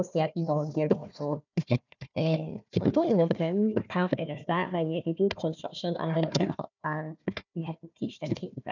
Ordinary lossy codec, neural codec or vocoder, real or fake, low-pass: none; codec, 16 kHz, 1 kbps, FunCodec, trained on Chinese and English, 50 frames a second; fake; 7.2 kHz